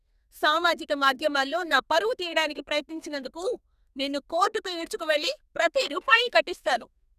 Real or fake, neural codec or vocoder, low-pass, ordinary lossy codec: fake; codec, 32 kHz, 1.9 kbps, SNAC; 14.4 kHz; none